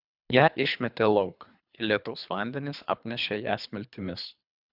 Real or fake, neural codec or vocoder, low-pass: fake; codec, 24 kHz, 3 kbps, HILCodec; 5.4 kHz